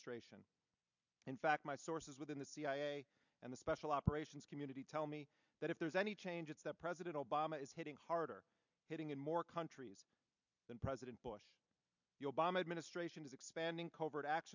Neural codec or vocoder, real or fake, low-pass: vocoder, 44.1 kHz, 128 mel bands every 256 samples, BigVGAN v2; fake; 7.2 kHz